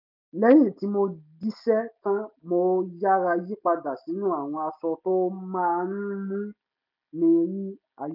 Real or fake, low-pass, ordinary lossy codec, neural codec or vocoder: real; 5.4 kHz; none; none